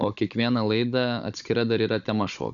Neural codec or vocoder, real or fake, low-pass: codec, 16 kHz, 16 kbps, FunCodec, trained on Chinese and English, 50 frames a second; fake; 7.2 kHz